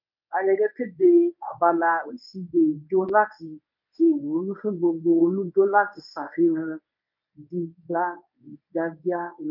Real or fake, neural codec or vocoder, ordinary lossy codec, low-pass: fake; codec, 24 kHz, 0.9 kbps, WavTokenizer, medium speech release version 1; none; 5.4 kHz